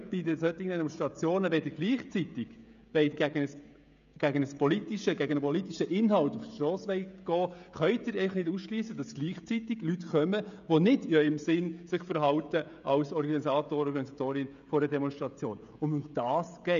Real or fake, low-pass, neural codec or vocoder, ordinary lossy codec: fake; 7.2 kHz; codec, 16 kHz, 16 kbps, FreqCodec, smaller model; none